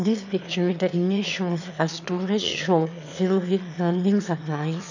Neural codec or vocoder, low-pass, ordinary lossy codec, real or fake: autoencoder, 22.05 kHz, a latent of 192 numbers a frame, VITS, trained on one speaker; 7.2 kHz; none; fake